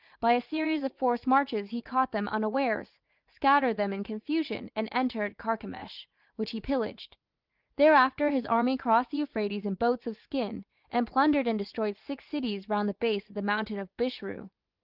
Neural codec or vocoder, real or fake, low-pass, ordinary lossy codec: vocoder, 44.1 kHz, 80 mel bands, Vocos; fake; 5.4 kHz; Opus, 32 kbps